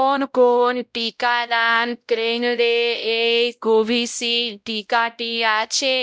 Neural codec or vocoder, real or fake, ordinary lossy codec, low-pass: codec, 16 kHz, 0.5 kbps, X-Codec, WavLM features, trained on Multilingual LibriSpeech; fake; none; none